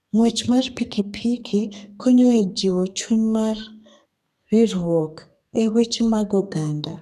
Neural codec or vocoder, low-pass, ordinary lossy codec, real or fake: codec, 32 kHz, 1.9 kbps, SNAC; 14.4 kHz; none; fake